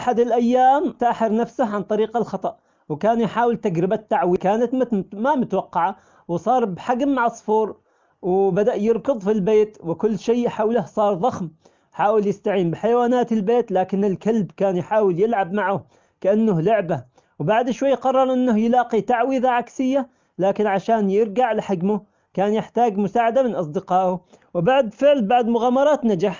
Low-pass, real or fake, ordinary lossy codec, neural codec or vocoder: 7.2 kHz; real; Opus, 24 kbps; none